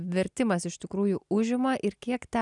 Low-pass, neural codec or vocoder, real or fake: 10.8 kHz; none; real